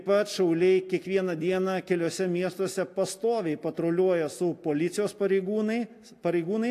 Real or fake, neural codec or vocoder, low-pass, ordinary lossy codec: real; none; 14.4 kHz; AAC, 64 kbps